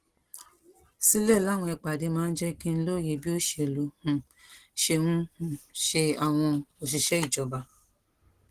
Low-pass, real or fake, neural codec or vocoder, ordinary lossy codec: 14.4 kHz; real; none; Opus, 16 kbps